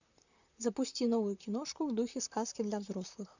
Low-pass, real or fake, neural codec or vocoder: 7.2 kHz; fake; vocoder, 44.1 kHz, 128 mel bands, Pupu-Vocoder